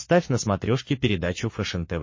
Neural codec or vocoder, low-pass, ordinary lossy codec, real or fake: codec, 44.1 kHz, 7.8 kbps, Pupu-Codec; 7.2 kHz; MP3, 32 kbps; fake